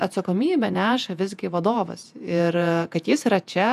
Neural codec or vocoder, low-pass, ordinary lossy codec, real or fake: vocoder, 48 kHz, 128 mel bands, Vocos; 14.4 kHz; AAC, 96 kbps; fake